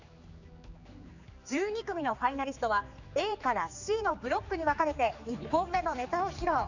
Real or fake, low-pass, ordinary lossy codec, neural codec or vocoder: fake; 7.2 kHz; none; codec, 16 kHz, 4 kbps, X-Codec, HuBERT features, trained on general audio